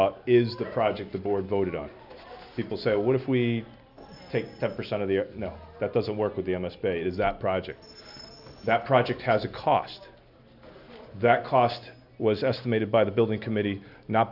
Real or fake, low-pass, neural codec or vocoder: real; 5.4 kHz; none